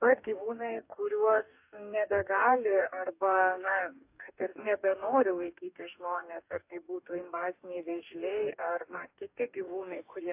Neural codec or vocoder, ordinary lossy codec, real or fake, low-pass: codec, 44.1 kHz, 2.6 kbps, DAC; AAC, 32 kbps; fake; 3.6 kHz